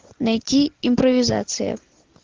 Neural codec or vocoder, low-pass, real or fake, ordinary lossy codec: none; 7.2 kHz; real; Opus, 16 kbps